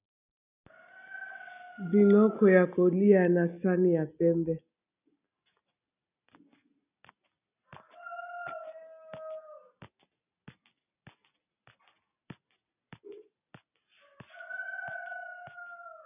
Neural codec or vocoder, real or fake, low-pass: none; real; 3.6 kHz